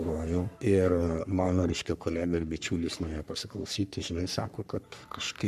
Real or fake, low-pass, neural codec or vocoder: fake; 14.4 kHz; codec, 44.1 kHz, 3.4 kbps, Pupu-Codec